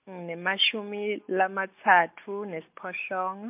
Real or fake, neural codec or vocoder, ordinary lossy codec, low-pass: real; none; MP3, 32 kbps; 3.6 kHz